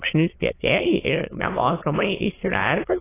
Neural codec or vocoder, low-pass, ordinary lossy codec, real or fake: autoencoder, 22.05 kHz, a latent of 192 numbers a frame, VITS, trained on many speakers; 3.6 kHz; AAC, 16 kbps; fake